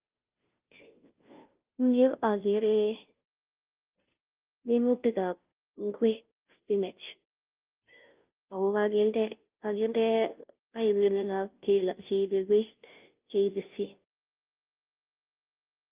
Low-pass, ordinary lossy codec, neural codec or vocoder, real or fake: 3.6 kHz; Opus, 32 kbps; codec, 16 kHz, 0.5 kbps, FunCodec, trained on Chinese and English, 25 frames a second; fake